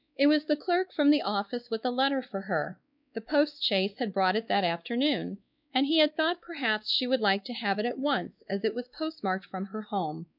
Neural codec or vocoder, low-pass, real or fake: codec, 16 kHz, 2 kbps, X-Codec, WavLM features, trained on Multilingual LibriSpeech; 5.4 kHz; fake